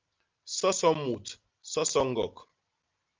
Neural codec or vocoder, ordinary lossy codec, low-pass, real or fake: none; Opus, 32 kbps; 7.2 kHz; real